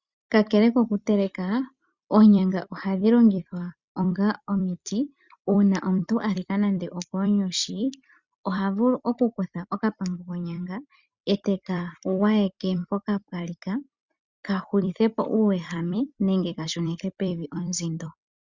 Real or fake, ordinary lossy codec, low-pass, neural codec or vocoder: fake; Opus, 64 kbps; 7.2 kHz; vocoder, 44.1 kHz, 128 mel bands every 256 samples, BigVGAN v2